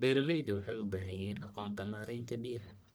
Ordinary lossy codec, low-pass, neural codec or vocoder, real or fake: none; none; codec, 44.1 kHz, 1.7 kbps, Pupu-Codec; fake